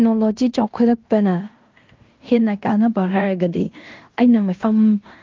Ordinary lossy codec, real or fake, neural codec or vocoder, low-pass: Opus, 24 kbps; fake; codec, 16 kHz in and 24 kHz out, 0.9 kbps, LongCat-Audio-Codec, fine tuned four codebook decoder; 7.2 kHz